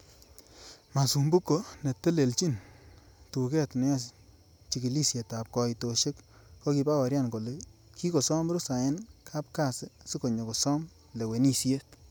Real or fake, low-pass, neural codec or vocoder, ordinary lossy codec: real; none; none; none